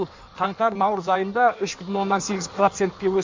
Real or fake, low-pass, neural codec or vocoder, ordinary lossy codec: fake; 7.2 kHz; codec, 16 kHz in and 24 kHz out, 1.1 kbps, FireRedTTS-2 codec; MP3, 64 kbps